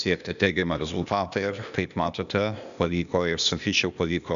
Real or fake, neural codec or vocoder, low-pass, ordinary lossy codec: fake; codec, 16 kHz, 0.8 kbps, ZipCodec; 7.2 kHz; MP3, 96 kbps